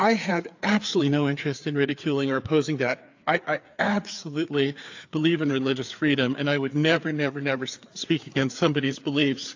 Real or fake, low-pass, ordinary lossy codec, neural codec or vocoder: fake; 7.2 kHz; AAC, 48 kbps; codec, 16 kHz, 4 kbps, FreqCodec, larger model